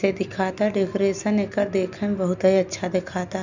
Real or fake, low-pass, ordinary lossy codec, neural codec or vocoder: real; 7.2 kHz; none; none